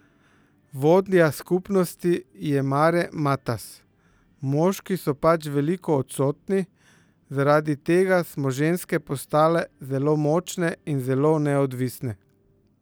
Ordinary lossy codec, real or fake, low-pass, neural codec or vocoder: none; real; none; none